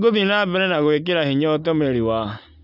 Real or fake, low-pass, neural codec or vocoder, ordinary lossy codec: real; 5.4 kHz; none; none